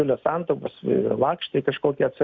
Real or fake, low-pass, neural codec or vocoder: real; 7.2 kHz; none